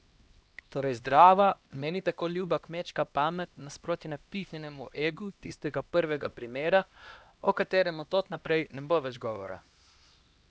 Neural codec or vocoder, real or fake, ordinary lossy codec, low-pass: codec, 16 kHz, 1 kbps, X-Codec, HuBERT features, trained on LibriSpeech; fake; none; none